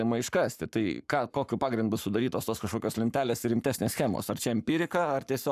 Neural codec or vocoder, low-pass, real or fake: codec, 44.1 kHz, 7.8 kbps, Pupu-Codec; 14.4 kHz; fake